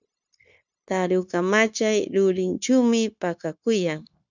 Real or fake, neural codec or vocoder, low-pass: fake; codec, 16 kHz, 0.9 kbps, LongCat-Audio-Codec; 7.2 kHz